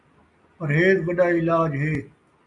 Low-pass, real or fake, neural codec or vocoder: 10.8 kHz; real; none